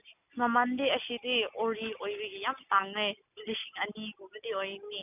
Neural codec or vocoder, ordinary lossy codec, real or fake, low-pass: none; none; real; 3.6 kHz